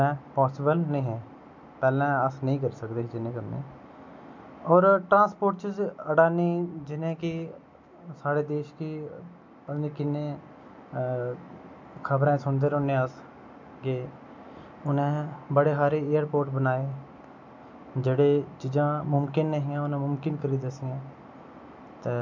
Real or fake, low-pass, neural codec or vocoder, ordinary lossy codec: real; 7.2 kHz; none; MP3, 64 kbps